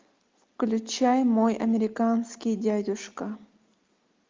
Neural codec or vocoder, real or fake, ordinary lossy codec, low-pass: none; real; Opus, 24 kbps; 7.2 kHz